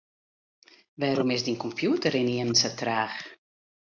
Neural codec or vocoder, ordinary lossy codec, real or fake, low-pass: none; MP3, 64 kbps; real; 7.2 kHz